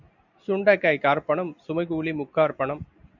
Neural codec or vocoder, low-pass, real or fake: none; 7.2 kHz; real